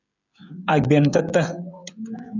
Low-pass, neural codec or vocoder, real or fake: 7.2 kHz; codec, 16 kHz, 16 kbps, FreqCodec, smaller model; fake